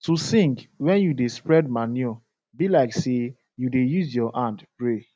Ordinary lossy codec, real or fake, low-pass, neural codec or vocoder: none; real; none; none